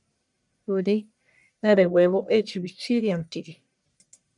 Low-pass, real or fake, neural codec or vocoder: 10.8 kHz; fake; codec, 44.1 kHz, 1.7 kbps, Pupu-Codec